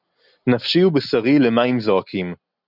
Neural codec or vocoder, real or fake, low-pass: none; real; 5.4 kHz